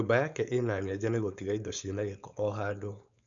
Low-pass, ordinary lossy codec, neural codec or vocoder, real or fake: 7.2 kHz; none; codec, 16 kHz, 4.8 kbps, FACodec; fake